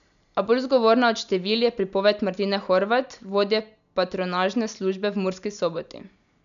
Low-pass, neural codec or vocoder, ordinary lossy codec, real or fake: 7.2 kHz; none; none; real